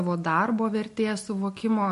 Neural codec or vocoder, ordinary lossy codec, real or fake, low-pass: none; MP3, 48 kbps; real; 14.4 kHz